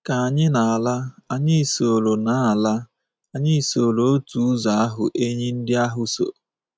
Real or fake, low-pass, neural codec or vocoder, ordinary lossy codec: real; none; none; none